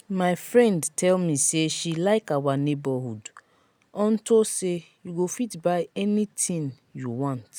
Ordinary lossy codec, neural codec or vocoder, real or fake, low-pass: none; none; real; none